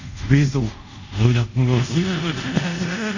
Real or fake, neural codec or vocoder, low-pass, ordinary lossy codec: fake; codec, 24 kHz, 0.5 kbps, DualCodec; 7.2 kHz; none